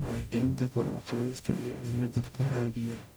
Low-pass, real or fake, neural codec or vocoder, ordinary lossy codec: none; fake; codec, 44.1 kHz, 0.9 kbps, DAC; none